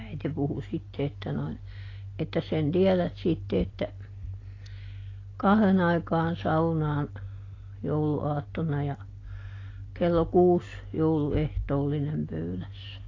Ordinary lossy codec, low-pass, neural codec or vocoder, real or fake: AAC, 32 kbps; 7.2 kHz; none; real